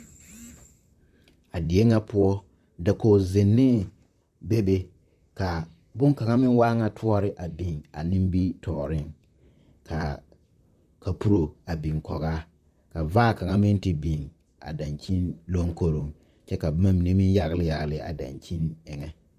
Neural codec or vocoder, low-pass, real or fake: vocoder, 44.1 kHz, 128 mel bands, Pupu-Vocoder; 14.4 kHz; fake